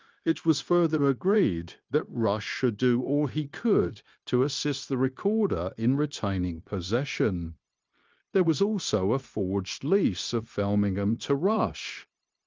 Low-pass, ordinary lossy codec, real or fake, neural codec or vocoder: 7.2 kHz; Opus, 24 kbps; fake; codec, 16 kHz, 0.9 kbps, LongCat-Audio-Codec